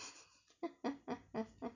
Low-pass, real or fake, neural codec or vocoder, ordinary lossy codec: 7.2 kHz; real; none; none